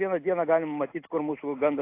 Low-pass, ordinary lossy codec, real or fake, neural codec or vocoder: 3.6 kHz; AAC, 24 kbps; real; none